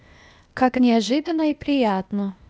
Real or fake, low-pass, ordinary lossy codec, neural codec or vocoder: fake; none; none; codec, 16 kHz, 0.8 kbps, ZipCodec